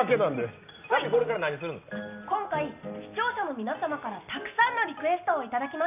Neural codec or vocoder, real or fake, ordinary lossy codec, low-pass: none; real; none; 3.6 kHz